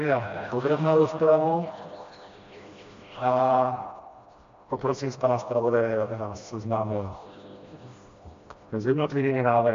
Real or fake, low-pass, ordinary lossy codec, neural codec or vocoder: fake; 7.2 kHz; MP3, 48 kbps; codec, 16 kHz, 1 kbps, FreqCodec, smaller model